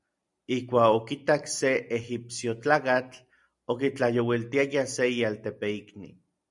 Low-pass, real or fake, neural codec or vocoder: 10.8 kHz; real; none